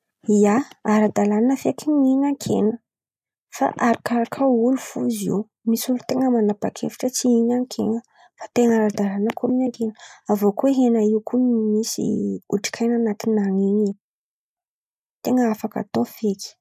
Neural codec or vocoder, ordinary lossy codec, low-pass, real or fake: none; none; 14.4 kHz; real